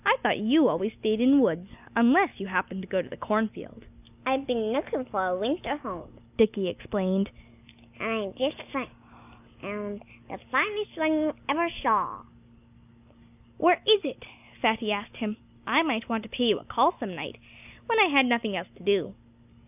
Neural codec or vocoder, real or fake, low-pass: none; real; 3.6 kHz